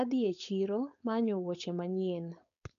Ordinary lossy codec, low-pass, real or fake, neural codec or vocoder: none; 7.2 kHz; fake; codec, 16 kHz, 4.8 kbps, FACodec